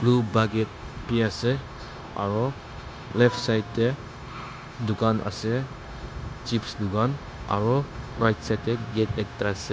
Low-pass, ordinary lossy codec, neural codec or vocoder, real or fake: none; none; codec, 16 kHz, 0.9 kbps, LongCat-Audio-Codec; fake